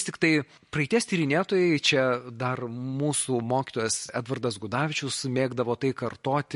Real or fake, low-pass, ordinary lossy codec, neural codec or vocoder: real; 14.4 kHz; MP3, 48 kbps; none